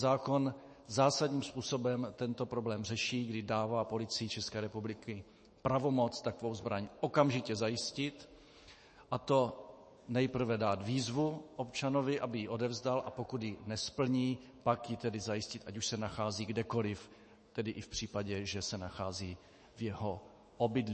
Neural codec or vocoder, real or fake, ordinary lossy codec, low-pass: autoencoder, 48 kHz, 128 numbers a frame, DAC-VAE, trained on Japanese speech; fake; MP3, 32 kbps; 10.8 kHz